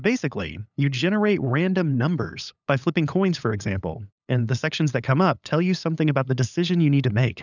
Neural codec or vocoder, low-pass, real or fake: codec, 16 kHz, 8 kbps, FunCodec, trained on LibriTTS, 25 frames a second; 7.2 kHz; fake